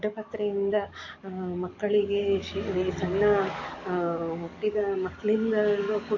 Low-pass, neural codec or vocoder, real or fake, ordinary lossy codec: 7.2 kHz; codec, 44.1 kHz, 7.8 kbps, DAC; fake; none